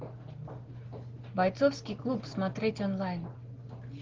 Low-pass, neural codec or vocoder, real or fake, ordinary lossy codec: 7.2 kHz; none; real; Opus, 16 kbps